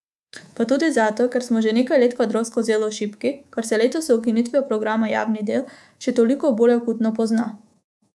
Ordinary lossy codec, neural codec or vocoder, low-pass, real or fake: none; codec, 24 kHz, 3.1 kbps, DualCodec; none; fake